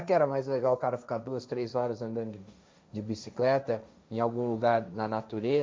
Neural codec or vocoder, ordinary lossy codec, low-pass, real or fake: codec, 16 kHz, 1.1 kbps, Voila-Tokenizer; none; none; fake